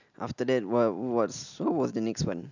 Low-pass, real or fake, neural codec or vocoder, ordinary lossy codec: 7.2 kHz; real; none; none